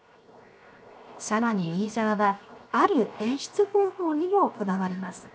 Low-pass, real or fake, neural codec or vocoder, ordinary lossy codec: none; fake; codec, 16 kHz, 0.7 kbps, FocalCodec; none